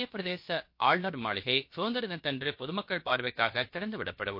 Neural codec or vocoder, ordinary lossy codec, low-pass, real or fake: codec, 16 kHz, about 1 kbps, DyCAST, with the encoder's durations; MP3, 32 kbps; 5.4 kHz; fake